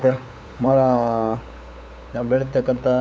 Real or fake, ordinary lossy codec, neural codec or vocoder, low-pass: fake; none; codec, 16 kHz, 8 kbps, FunCodec, trained on LibriTTS, 25 frames a second; none